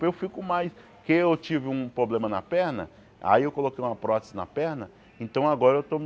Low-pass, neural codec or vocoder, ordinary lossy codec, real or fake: none; none; none; real